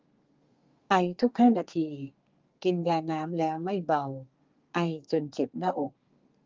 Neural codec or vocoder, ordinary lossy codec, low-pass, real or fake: codec, 32 kHz, 1.9 kbps, SNAC; Opus, 32 kbps; 7.2 kHz; fake